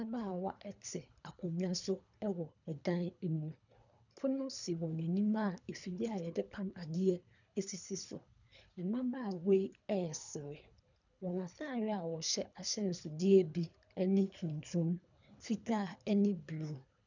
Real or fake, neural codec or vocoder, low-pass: fake; codec, 24 kHz, 3 kbps, HILCodec; 7.2 kHz